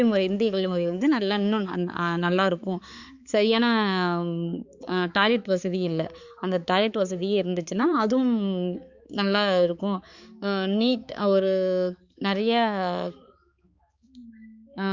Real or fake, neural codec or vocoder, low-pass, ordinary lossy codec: fake; codec, 16 kHz, 4 kbps, X-Codec, HuBERT features, trained on balanced general audio; 7.2 kHz; Opus, 64 kbps